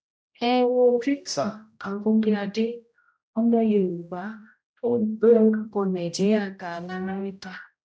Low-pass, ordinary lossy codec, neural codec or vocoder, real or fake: none; none; codec, 16 kHz, 0.5 kbps, X-Codec, HuBERT features, trained on general audio; fake